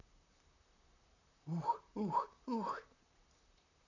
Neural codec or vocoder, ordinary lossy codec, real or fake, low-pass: vocoder, 44.1 kHz, 128 mel bands, Pupu-Vocoder; none; fake; 7.2 kHz